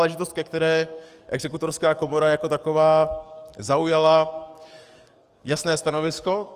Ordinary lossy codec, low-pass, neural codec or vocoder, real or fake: Opus, 32 kbps; 14.4 kHz; codec, 44.1 kHz, 7.8 kbps, DAC; fake